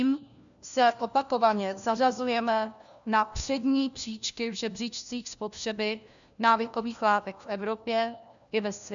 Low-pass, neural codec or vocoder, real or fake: 7.2 kHz; codec, 16 kHz, 1 kbps, FunCodec, trained on LibriTTS, 50 frames a second; fake